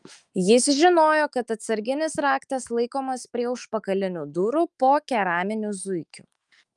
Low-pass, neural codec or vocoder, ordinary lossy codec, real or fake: 10.8 kHz; autoencoder, 48 kHz, 128 numbers a frame, DAC-VAE, trained on Japanese speech; Opus, 32 kbps; fake